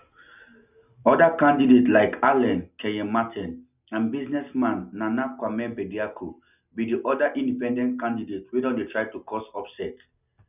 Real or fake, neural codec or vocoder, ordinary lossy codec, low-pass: real; none; none; 3.6 kHz